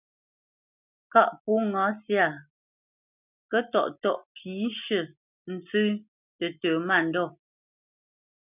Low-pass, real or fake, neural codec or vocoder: 3.6 kHz; real; none